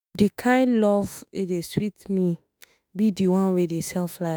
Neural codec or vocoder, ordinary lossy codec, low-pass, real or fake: autoencoder, 48 kHz, 32 numbers a frame, DAC-VAE, trained on Japanese speech; none; none; fake